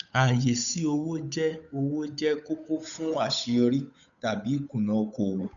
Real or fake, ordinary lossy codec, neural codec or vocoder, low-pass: fake; none; codec, 16 kHz, 8 kbps, FunCodec, trained on Chinese and English, 25 frames a second; 7.2 kHz